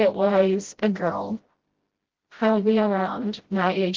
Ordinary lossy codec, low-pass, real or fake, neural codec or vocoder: Opus, 16 kbps; 7.2 kHz; fake; codec, 16 kHz, 0.5 kbps, FreqCodec, smaller model